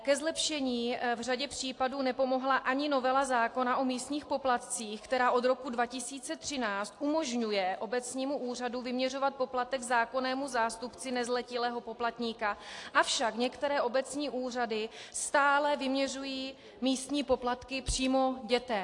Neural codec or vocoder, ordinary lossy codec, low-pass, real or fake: none; AAC, 48 kbps; 10.8 kHz; real